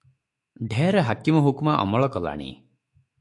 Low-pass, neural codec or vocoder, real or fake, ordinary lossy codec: 10.8 kHz; autoencoder, 48 kHz, 128 numbers a frame, DAC-VAE, trained on Japanese speech; fake; MP3, 48 kbps